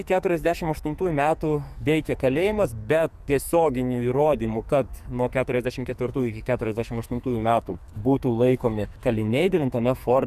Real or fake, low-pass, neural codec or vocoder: fake; 14.4 kHz; codec, 44.1 kHz, 2.6 kbps, SNAC